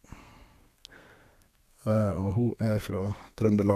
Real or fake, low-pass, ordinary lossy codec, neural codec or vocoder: fake; 14.4 kHz; none; codec, 32 kHz, 1.9 kbps, SNAC